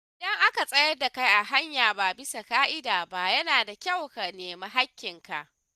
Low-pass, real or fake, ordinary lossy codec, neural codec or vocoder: 14.4 kHz; real; Opus, 64 kbps; none